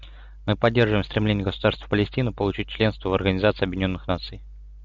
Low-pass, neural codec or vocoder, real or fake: 7.2 kHz; none; real